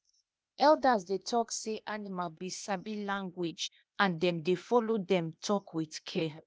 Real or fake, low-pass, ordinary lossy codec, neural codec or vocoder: fake; none; none; codec, 16 kHz, 0.8 kbps, ZipCodec